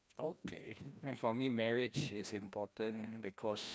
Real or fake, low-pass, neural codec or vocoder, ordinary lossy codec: fake; none; codec, 16 kHz, 1 kbps, FreqCodec, larger model; none